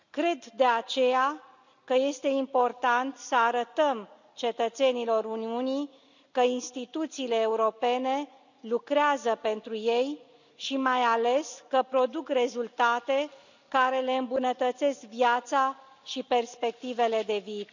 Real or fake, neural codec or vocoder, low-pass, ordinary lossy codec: real; none; 7.2 kHz; none